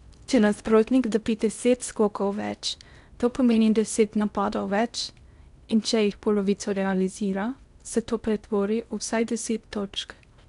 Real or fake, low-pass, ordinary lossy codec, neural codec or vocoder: fake; 10.8 kHz; none; codec, 16 kHz in and 24 kHz out, 0.6 kbps, FocalCodec, streaming, 4096 codes